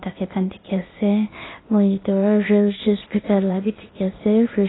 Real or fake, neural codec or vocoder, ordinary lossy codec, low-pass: fake; codec, 16 kHz, 0.8 kbps, ZipCodec; AAC, 16 kbps; 7.2 kHz